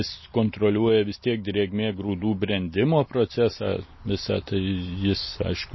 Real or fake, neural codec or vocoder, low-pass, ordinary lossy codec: real; none; 7.2 kHz; MP3, 24 kbps